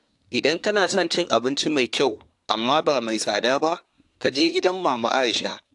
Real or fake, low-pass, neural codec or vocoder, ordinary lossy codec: fake; 10.8 kHz; codec, 24 kHz, 1 kbps, SNAC; AAC, 64 kbps